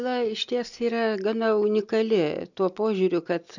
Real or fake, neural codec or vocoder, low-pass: real; none; 7.2 kHz